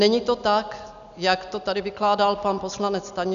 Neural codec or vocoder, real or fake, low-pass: none; real; 7.2 kHz